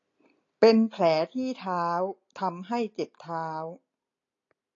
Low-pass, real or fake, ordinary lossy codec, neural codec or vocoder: 7.2 kHz; real; AAC, 32 kbps; none